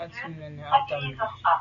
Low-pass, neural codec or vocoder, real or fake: 7.2 kHz; none; real